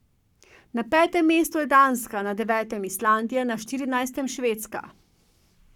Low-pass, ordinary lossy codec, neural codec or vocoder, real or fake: 19.8 kHz; none; codec, 44.1 kHz, 7.8 kbps, Pupu-Codec; fake